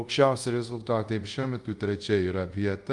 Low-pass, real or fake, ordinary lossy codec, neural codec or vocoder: 10.8 kHz; fake; Opus, 32 kbps; codec, 24 kHz, 0.5 kbps, DualCodec